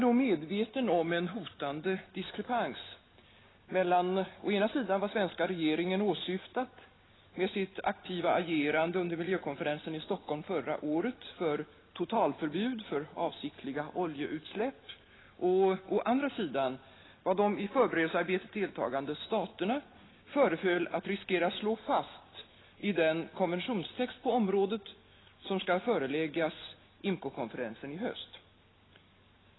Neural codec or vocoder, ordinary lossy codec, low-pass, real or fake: none; AAC, 16 kbps; 7.2 kHz; real